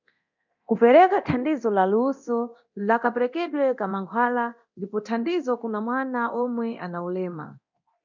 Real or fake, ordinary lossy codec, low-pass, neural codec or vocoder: fake; MP3, 64 kbps; 7.2 kHz; codec, 24 kHz, 0.9 kbps, DualCodec